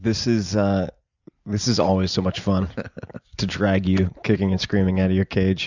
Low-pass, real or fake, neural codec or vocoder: 7.2 kHz; real; none